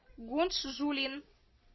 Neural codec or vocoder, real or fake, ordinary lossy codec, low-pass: none; real; MP3, 24 kbps; 7.2 kHz